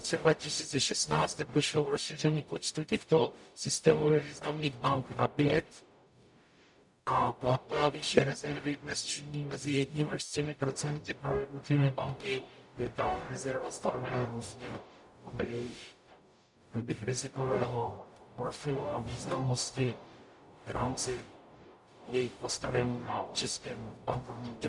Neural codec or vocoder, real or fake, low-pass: codec, 44.1 kHz, 0.9 kbps, DAC; fake; 10.8 kHz